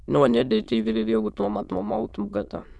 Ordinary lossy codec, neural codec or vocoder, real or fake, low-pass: none; autoencoder, 22.05 kHz, a latent of 192 numbers a frame, VITS, trained on many speakers; fake; none